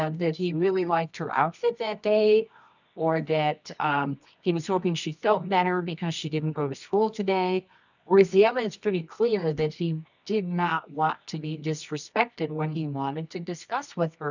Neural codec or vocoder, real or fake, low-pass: codec, 24 kHz, 0.9 kbps, WavTokenizer, medium music audio release; fake; 7.2 kHz